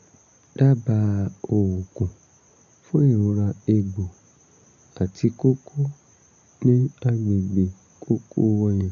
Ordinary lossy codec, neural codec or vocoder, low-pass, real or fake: none; none; 7.2 kHz; real